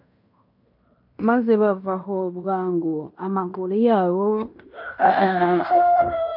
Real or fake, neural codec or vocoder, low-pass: fake; codec, 16 kHz in and 24 kHz out, 0.9 kbps, LongCat-Audio-Codec, fine tuned four codebook decoder; 5.4 kHz